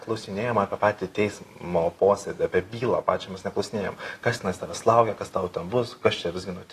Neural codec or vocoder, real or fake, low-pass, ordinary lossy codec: none; real; 14.4 kHz; AAC, 48 kbps